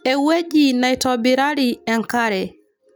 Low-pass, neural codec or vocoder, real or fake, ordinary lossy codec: none; none; real; none